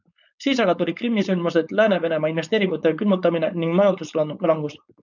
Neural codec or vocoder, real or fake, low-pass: codec, 16 kHz, 4.8 kbps, FACodec; fake; 7.2 kHz